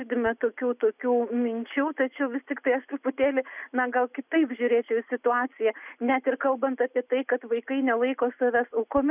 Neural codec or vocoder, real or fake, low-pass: none; real; 3.6 kHz